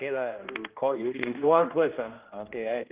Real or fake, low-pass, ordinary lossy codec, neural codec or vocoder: fake; 3.6 kHz; Opus, 32 kbps; codec, 16 kHz, 1 kbps, X-Codec, HuBERT features, trained on general audio